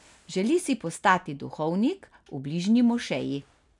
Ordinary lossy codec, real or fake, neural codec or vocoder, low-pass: none; real; none; 10.8 kHz